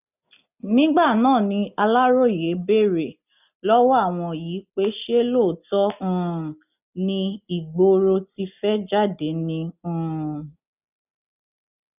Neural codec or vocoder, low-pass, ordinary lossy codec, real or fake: none; 3.6 kHz; none; real